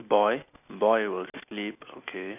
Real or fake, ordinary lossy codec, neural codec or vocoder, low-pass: real; none; none; 3.6 kHz